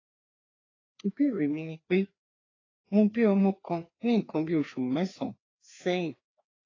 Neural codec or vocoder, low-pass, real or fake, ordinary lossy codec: codec, 16 kHz, 2 kbps, FreqCodec, larger model; 7.2 kHz; fake; AAC, 32 kbps